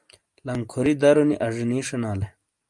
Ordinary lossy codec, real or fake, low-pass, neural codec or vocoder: Opus, 32 kbps; real; 10.8 kHz; none